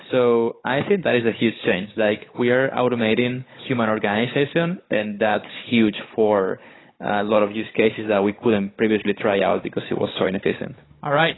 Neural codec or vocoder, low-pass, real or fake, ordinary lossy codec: codec, 16 kHz, 16 kbps, FunCodec, trained on Chinese and English, 50 frames a second; 7.2 kHz; fake; AAC, 16 kbps